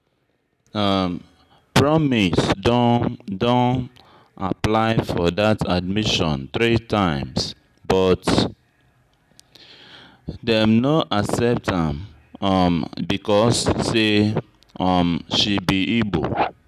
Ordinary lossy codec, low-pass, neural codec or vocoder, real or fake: none; 14.4 kHz; none; real